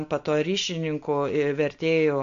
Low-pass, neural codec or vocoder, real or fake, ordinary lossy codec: 7.2 kHz; none; real; AAC, 64 kbps